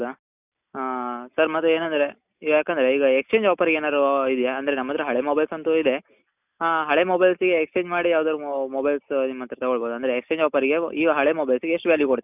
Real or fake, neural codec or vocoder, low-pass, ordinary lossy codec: real; none; 3.6 kHz; none